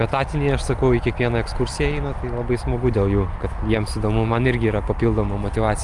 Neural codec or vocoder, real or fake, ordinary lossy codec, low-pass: none; real; Opus, 32 kbps; 10.8 kHz